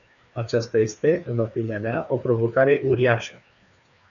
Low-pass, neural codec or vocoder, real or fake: 7.2 kHz; codec, 16 kHz, 2 kbps, FreqCodec, larger model; fake